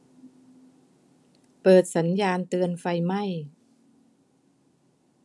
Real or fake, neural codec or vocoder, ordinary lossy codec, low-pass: real; none; none; none